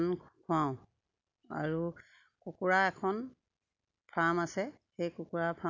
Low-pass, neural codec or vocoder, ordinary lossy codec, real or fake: 7.2 kHz; none; none; real